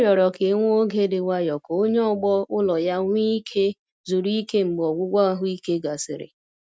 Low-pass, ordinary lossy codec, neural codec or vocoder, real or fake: none; none; none; real